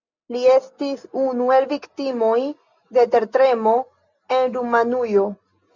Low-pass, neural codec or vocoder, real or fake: 7.2 kHz; none; real